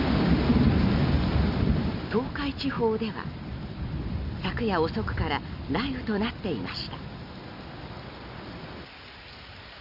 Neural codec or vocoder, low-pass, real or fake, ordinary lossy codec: none; 5.4 kHz; real; none